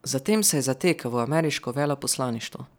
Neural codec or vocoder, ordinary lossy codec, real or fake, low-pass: none; none; real; none